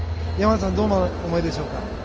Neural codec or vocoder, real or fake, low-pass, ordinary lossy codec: none; real; 7.2 kHz; Opus, 24 kbps